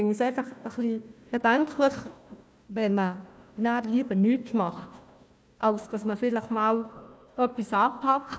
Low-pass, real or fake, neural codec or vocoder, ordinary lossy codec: none; fake; codec, 16 kHz, 1 kbps, FunCodec, trained on Chinese and English, 50 frames a second; none